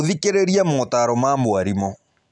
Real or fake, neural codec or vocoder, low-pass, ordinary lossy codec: real; none; 10.8 kHz; none